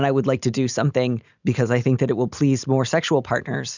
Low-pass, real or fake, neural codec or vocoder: 7.2 kHz; real; none